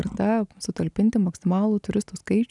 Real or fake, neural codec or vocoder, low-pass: real; none; 10.8 kHz